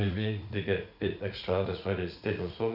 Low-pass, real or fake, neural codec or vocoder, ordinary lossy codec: 5.4 kHz; fake; vocoder, 44.1 kHz, 80 mel bands, Vocos; none